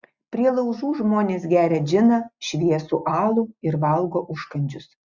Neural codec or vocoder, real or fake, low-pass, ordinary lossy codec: none; real; 7.2 kHz; Opus, 64 kbps